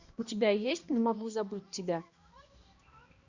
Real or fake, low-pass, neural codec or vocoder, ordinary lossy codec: fake; 7.2 kHz; codec, 16 kHz, 1 kbps, X-Codec, HuBERT features, trained on balanced general audio; Opus, 64 kbps